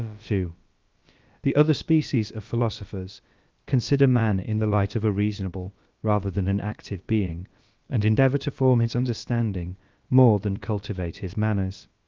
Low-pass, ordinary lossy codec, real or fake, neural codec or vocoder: 7.2 kHz; Opus, 32 kbps; fake; codec, 16 kHz, about 1 kbps, DyCAST, with the encoder's durations